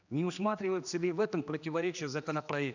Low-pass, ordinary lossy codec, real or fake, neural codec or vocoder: 7.2 kHz; none; fake; codec, 16 kHz, 2 kbps, X-Codec, HuBERT features, trained on general audio